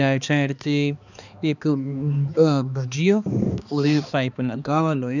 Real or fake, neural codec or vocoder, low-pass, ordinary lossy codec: fake; codec, 16 kHz, 2 kbps, X-Codec, HuBERT features, trained on balanced general audio; 7.2 kHz; none